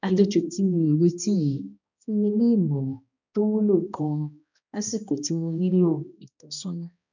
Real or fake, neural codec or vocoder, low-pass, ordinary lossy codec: fake; codec, 16 kHz, 1 kbps, X-Codec, HuBERT features, trained on balanced general audio; 7.2 kHz; none